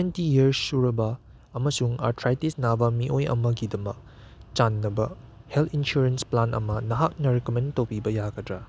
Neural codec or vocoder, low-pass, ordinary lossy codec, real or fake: none; none; none; real